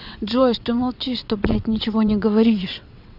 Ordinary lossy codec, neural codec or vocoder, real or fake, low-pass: none; vocoder, 22.05 kHz, 80 mel bands, WaveNeXt; fake; 5.4 kHz